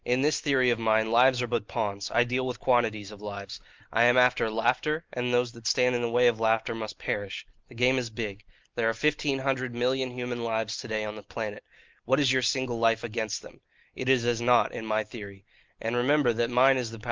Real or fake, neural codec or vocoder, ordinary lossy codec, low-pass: real; none; Opus, 32 kbps; 7.2 kHz